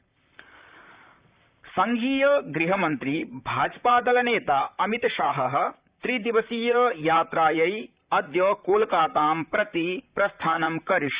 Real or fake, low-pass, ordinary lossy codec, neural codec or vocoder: fake; 3.6 kHz; Opus, 64 kbps; vocoder, 44.1 kHz, 128 mel bands, Pupu-Vocoder